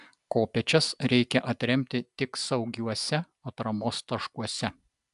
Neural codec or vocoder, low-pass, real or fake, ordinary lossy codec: none; 10.8 kHz; real; AAC, 96 kbps